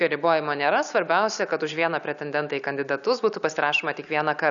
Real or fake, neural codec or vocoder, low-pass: real; none; 7.2 kHz